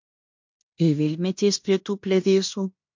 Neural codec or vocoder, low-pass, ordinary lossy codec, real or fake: codec, 16 kHz in and 24 kHz out, 0.9 kbps, LongCat-Audio-Codec, fine tuned four codebook decoder; 7.2 kHz; MP3, 48 kbps; fake